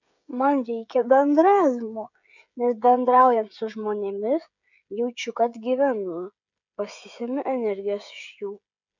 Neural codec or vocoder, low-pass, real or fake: codec, 16 kHz, 16 kbps, FreqCodec, smaller model; 7.2 kHz; fake